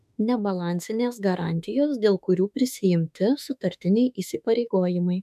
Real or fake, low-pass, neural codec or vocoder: fake; 14.4 kHz; autoencoder, 48 kHz, 32 numbers a frame, DAC-VAE, trained on Japanese speech